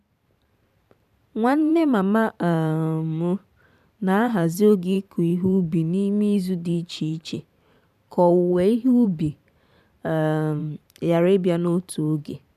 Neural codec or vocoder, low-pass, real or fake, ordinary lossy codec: vocoder, 44.1 kHz, 128 mel bands every 512 samples, BigVGAN v2; 14.4 kHz; fake; none